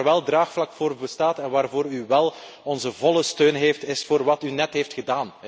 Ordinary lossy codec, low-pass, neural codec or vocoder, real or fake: none; none; none; real